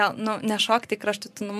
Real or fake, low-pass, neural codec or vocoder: fake; 14.4 kHz; vocoder, 44.1 kHz, 128 mel bands every 512 samples, BigVGAN v2